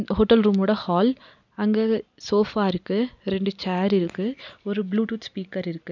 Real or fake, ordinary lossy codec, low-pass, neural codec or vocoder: real; none; 7.2 kHz; none